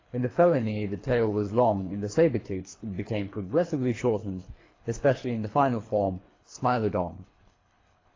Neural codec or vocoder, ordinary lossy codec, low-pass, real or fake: codec, 24 kHz, 3 kbps, HILCodec; AAC, 32 kbps; 7.2 kHz; fake